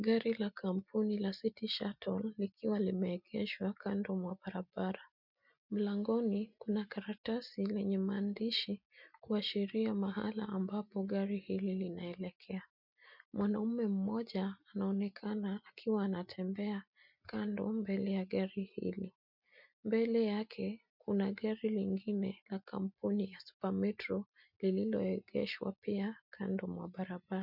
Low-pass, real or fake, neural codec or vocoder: 5.4 kHz; real; none